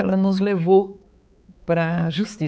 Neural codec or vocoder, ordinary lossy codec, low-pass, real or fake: codec, 16 kHz, 4 kbps, X-Codec, HuBERT features, trained on balanced general audio; none; none; fake